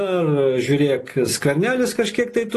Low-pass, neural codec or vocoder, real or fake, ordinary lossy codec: 14.4 kHz; none; real; AAC, 48 kbps